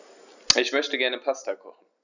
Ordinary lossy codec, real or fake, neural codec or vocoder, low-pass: none; real; none; 7.2 kHz